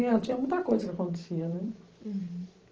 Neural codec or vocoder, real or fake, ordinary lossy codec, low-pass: vocoder, 44.1 kHz, 128 mel bands every 512 samples, BigVGAN v2; fake; Opus, 16 kbps; 7.2 kHz